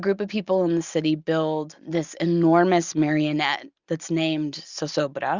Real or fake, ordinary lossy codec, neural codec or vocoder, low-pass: real; Opus, 64 kbps; none; 7.2 kHz